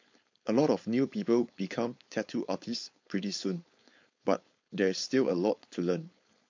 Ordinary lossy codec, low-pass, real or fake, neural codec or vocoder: MP3, 48 kbps; 7.2 kHz; fake; codec, 16 kHz, 4.8 kbps, FACodec